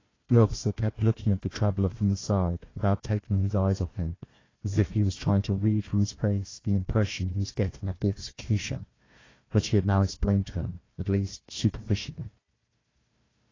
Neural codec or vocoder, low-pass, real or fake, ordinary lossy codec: codec, 16 kHz, 1 kbps, FunCodec, trained on Chinese and English, 50 frames a second; 7.2 kHz; fake; AAC, 32 kbps